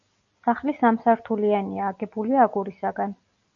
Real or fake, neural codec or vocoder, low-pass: real; none; 7.2 kHz